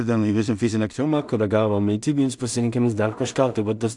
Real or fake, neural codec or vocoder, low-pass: fake; codec, 16 kHz in and 24 kHz out, 0.4 kbps, LongCat-Audio-Codec, two codebook decoder; 10.8 kHz